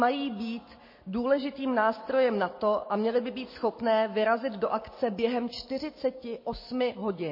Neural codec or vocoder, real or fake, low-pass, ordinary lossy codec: none; real; 5.4 kHz; MP3, 24 kbps